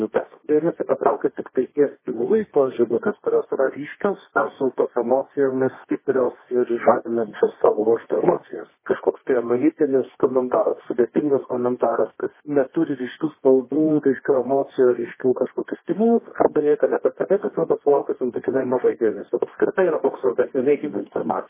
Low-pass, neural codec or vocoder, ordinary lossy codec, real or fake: 3.6 kHz; codec, 24 kHz, 0.9 kbps, WavTokenizer, medium music audio release; MP3, 16 kbps; fake